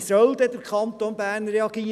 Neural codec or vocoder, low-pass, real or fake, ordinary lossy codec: none; 14.4 kHz; real; none